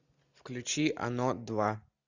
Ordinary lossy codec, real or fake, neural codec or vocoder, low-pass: Opus, 64 kbps; real; none; 7.2 kHz